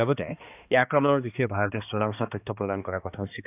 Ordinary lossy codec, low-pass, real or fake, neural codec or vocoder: none; 3.6 kHz; fake; codec, 16 kHz, 2 kbps, X-Codec, HuBERT features, trained on balanced general audio